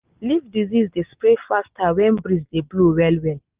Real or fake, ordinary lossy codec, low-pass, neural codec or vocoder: real; Opus, 24 kbps; 3.6 kHz; none